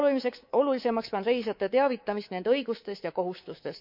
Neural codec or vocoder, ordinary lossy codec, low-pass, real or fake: codec, 24 kHz, 3.1 kbps, DualCodec; none; 5.4 kHz; fake